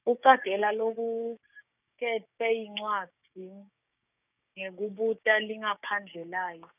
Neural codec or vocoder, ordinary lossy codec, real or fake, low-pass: codec, 16 kHz, 6 kbps, DAC; none; fake; 3.6 kHz